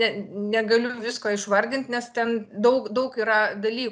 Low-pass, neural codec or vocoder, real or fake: 9.9 kHz; vocoder, 44.1 kHz, 128 mel bands every 256 samples, BigVGAN v2; fake